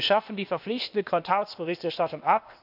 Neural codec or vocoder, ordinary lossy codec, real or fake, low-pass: codec, 16 kHz, 0.8 kbps, ZipCodec; none; fake; 5.4 kHz